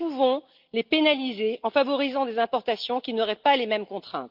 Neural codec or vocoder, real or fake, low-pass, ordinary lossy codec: none; real; 5.4 kHz; Opus, 24 kbps